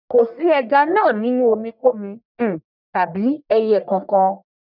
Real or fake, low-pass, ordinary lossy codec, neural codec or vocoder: fake; 5.4 kHz; none; codec, 44.1 kHz, 1.7 kbps, Pupu-Codec